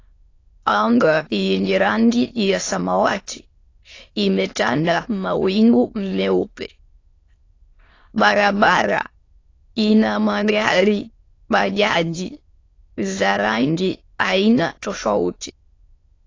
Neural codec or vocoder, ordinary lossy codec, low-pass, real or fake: autoencoder, 22.05 kHz, a latent of 192 numbers a frame, VITS, trained on many speakers; AAC, 32 kbps; 7.2 kHz; fake